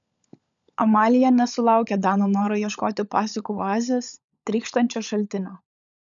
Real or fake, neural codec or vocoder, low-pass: fake; codec, 16 kHz, 16 kbps, FunCodec, trained on LibriTTS, 50 frames a second; 7.2 kHz